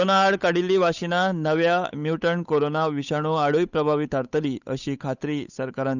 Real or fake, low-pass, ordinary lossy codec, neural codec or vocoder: fake; 7.2 kHz; none; codec, 16 kHz, 8 kbps, FunCodec, trained on Chinese and English, 25 frames a second